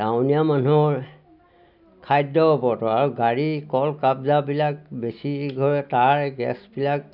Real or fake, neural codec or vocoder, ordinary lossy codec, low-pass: real; none; none; 5.4 kHz